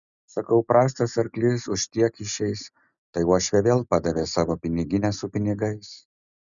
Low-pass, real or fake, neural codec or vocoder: 7.2 kHz; real; none